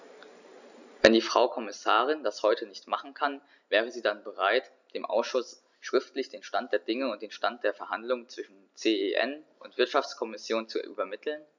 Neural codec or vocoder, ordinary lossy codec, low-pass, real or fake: none; none; 7.2 kHz; real